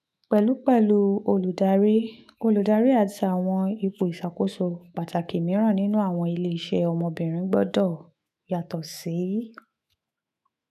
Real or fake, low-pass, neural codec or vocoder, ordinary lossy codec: fake; 14.4 kHz; autoencoder, 48 kHz, 128 numbers a frame, DAC-VAE, trained on Japanese speech; none